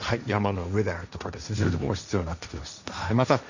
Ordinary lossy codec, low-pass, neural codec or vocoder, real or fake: none; 7.2 kHz; codec, 16 kHz, 1.1 kbps, Voila-Tokenizer; fake